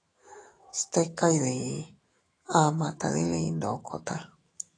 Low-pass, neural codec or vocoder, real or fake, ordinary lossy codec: 9.9 kHz; autoencoder, 48 kHz, 128 numbers a frame, DAC-VAE, trained on Japanese speech; fake; AAC, 32 kbps